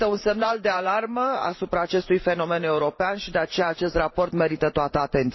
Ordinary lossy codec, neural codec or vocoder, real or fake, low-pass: MP3, 24 kbps; vocoder, 44.1 kHz, 128 mel bands every 512 samples, BigVGAN v2; fake; 7.2 kHz